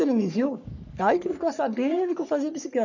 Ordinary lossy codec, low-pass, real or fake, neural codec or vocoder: none; 7.2 kHz; fake; codec, 44.1 kHz, 3.4 kbps, Pupu-Codec